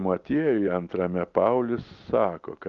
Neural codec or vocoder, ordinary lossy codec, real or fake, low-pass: none; Opus, 32 kbps; real; 7.2 kHz